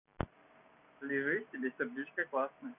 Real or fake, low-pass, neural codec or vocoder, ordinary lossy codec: real; 3.6 kHz; none; none